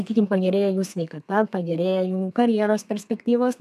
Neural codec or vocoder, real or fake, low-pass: codec, 32 kHz, 1.9 kbps, SNAC; fake; 14.4 kHz